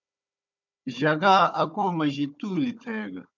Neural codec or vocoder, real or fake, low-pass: codec, 16 kHz, 4 kbps, FunCodec, trained on Chinese and English, 50 frames a second; fake; 7.2 kHz